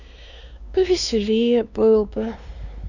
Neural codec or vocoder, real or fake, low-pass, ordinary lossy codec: codec, 16 kHz, 2 kbps, X-Codec, WavLM features, trained on Multilingual LibriSpeech; fake; 7.2 kHz; none